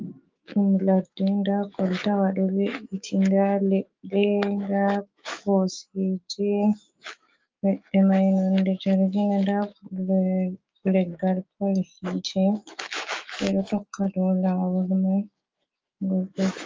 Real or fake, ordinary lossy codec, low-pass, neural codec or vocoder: fake; Opus, 24 kbps; 7.2 kHz; autoencoder, 48 kHz, 128 numbers a frame, DAC-VAE, trained on Japanese speech